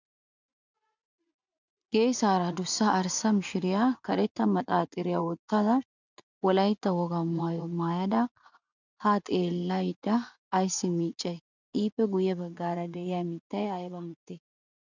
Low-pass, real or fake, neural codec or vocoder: 7.2 kHz; fake; vocoder, 24 kHz, 100 mel bands, Vocos